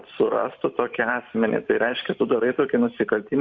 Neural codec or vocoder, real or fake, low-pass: vocoder, 44.1 kHz, 128 mel bands every 512 samples, BigVGAN v2; fake; 7.2 kHz